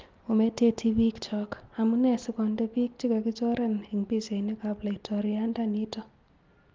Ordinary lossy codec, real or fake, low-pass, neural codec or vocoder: Opus, 32 kbps; real; 7.2 kHz; none